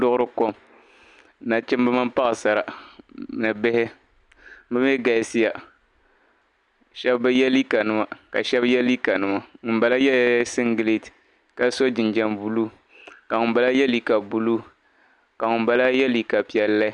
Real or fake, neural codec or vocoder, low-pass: real; none; 10.8 kHz